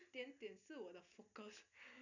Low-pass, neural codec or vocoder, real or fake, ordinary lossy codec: 7.2 kHz; none; real; none